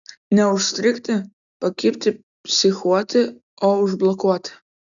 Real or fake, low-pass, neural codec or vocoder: real; 7.2 kHz; none